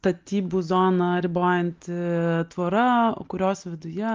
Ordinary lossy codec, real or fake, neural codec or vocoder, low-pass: Opus, 32 kbps; real; none; 7.2 kHz